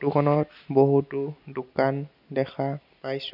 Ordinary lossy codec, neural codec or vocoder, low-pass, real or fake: none; none; 5.4 kHz; real